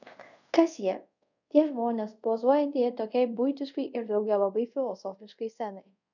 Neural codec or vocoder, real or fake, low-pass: codec, 24 kHz, 0.5 kbps, DualCodec; fake; 7.2 kHz